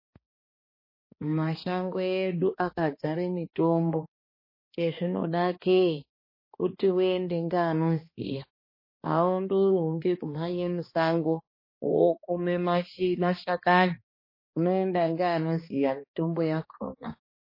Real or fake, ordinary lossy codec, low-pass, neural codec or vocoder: fake; MP3, 24 kbps; 5.4 kHz; codec, 16 kHz, 2 kbps, X-Codec, HuBERT features, trained on balanced general audio